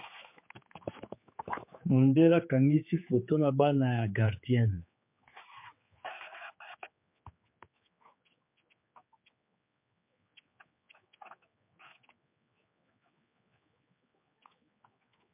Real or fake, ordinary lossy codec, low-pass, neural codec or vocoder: fake; MP3, 32 kbps; 3.6 kHz; codec, 16 kHz, 4 kbps, X-Codec, HuBERT features, trained on general audio